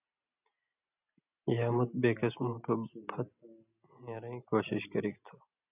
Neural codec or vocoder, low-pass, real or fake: none; 3.6 kHz; real